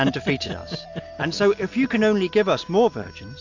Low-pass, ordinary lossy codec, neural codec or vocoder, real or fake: 7.2 kHz; AAC, 48 kbps; none; real